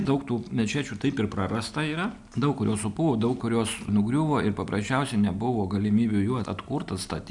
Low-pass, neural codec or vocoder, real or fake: 10.8 kHz; none; real